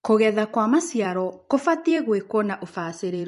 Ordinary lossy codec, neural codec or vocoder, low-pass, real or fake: MP3, 48 kbps; vocoder, 44.1 kHz, 128 mel bands every 256 samples, BigVGAN v2; 14.4 kHz; fake